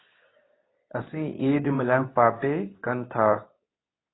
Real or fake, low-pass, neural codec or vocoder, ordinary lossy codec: fake; 7.2 kHz; codec, 24 kHz, 0.9 kbps, WavTokenizer, medium speech release version 2; AAC, 16 kbps